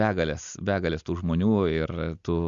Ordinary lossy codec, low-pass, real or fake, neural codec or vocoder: Opus, 64 kbps; 7.2 kHz; real; none